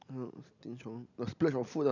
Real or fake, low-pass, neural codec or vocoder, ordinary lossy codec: real; 7.2 kHz; none; none